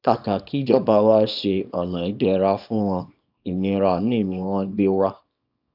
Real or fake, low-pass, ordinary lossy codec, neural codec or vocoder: fake; 5.4 kHz; AAC, 48 kbps; codec, 24 kHz, 0.9 kbps, WavTokenizer, small release